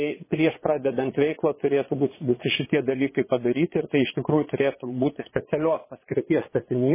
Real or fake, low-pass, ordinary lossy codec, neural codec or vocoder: fake; 3.6 kHz; MP3, 16 kbps; vocoder, 22.05 kHz, 80 mel bands, Vocos